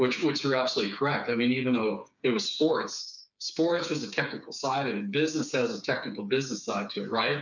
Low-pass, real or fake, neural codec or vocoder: 7.2 kHz; fake; codec, 16 kHz, 4 kbps, FreqCodec, smaller model